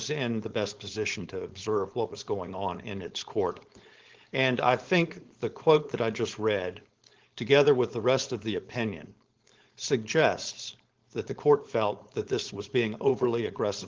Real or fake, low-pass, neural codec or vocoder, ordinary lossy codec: fake; 7.2 kHz; codec, 16 kHz, 4.8 kbps, FACodec; Opus, 16 kbps